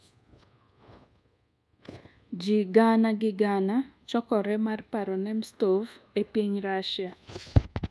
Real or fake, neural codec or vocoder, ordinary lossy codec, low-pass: fake; codec, 24 kHz, 1.2 kbps, DualCodec; none; none